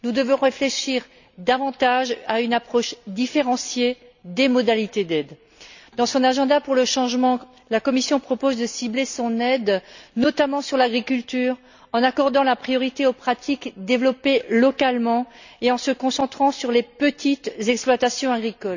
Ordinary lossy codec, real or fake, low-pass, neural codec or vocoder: none; real; 7.2 kHz; none